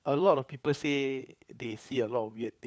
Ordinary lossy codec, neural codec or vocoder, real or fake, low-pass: none; codec, 16 kHz, 4 kbps, FunCodec, trained on LibriTTS, 50 frames a second; fake; none